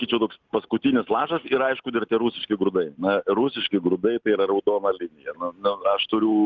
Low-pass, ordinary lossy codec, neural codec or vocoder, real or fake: 7.2 kHz; Opus, 24 kbps; none; real